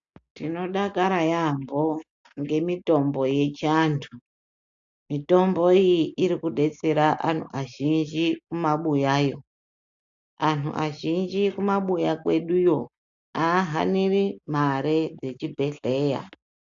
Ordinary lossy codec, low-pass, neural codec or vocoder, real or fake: AAC, 64 kbps; 7.2 kHz; none; real